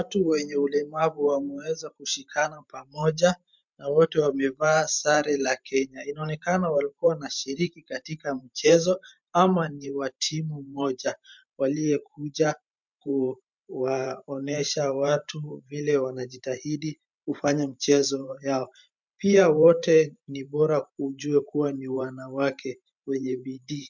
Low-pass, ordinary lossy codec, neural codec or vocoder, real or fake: 7.2 kHz; MP3, 64 kbps; vocoder, 44.1 kHz, 128 mel bands every 512 samples, BigVGAN v2; fake